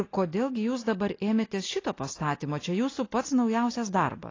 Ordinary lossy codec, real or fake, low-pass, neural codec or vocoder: AAC, 32 kbps; real; 7.2 kHz; none